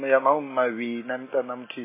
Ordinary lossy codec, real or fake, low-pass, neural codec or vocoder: MP3, 16 kbps; real; 3.6 kHz; none